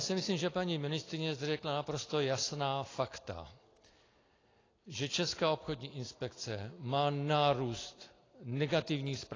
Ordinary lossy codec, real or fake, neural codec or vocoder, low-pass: AAC, 32 kbps; real; none; 7.2 kHz